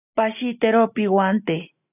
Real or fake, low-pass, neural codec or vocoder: real; 3.6 kHz; none